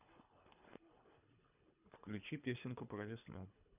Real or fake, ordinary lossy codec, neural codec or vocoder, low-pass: fake; none; codec, 24 kHz, 3 kbps, HILCodec; 3.6 kHz